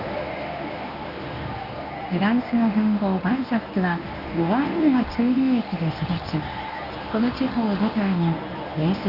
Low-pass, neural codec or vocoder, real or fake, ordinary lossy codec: 5.4 kHz; codec, 24 kHz, 0.9 kbps, WavTokenizer, medium speech release version 1; fake; none